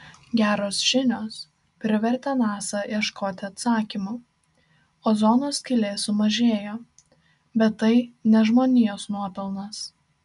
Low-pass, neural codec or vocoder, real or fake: 10.8 kHz; none; real